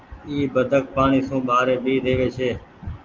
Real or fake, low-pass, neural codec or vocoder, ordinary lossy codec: real; 7.2 kHz; none; Opus, 32 kbps